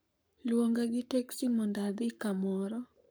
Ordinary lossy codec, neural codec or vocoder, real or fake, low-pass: none; codec, 44.1 kHz, 7.8 kbps, Pupu-Codec; fake; none